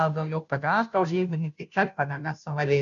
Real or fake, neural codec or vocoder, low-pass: fake; codec, 16 kHz, 0.5 kbps, FunCodec, trained on Chinese and English, 25 frames a second; 7.2 kHz